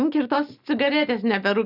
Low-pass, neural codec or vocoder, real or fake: 5.4 kHz; none; real